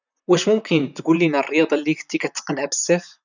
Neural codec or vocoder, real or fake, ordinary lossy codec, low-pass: vocoder, 44.1 kHz, 128 mel bands, Pupu-Vocoder; fake; none; 7.2 kHz